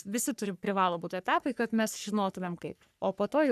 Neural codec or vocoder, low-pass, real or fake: codec, 44.1 kHz, 3.4 kbps, Pupu-Codec; 14.4 kHz; fake